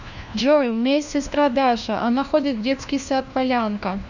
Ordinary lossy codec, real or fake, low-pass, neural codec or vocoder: none; fake; 7.2 kHz; codec, 16 kHz, 1 kbps, FunCodec, trained on LibriTTS, 50 frames a second